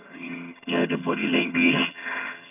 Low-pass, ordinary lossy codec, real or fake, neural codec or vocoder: 3.6 kHz; none; fake; vocoder, 22.05 kHz, 80 mel bands, HiFi-GAN